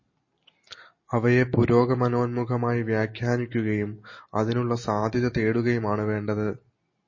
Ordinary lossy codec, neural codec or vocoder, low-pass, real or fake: MP3, 32 kbps; none; 7.2 kHz; real